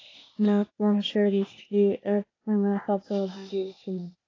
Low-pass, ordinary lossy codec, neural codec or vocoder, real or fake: 7.2 kHz; AAC, 32 kbps; codec, 16 kHz, 0.8 kbps, ZipCodec; fake